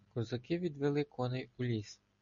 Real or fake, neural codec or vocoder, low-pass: real; none; 7.2 kHz